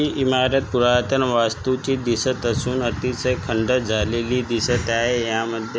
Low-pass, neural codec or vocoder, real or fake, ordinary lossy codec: none; none; real; none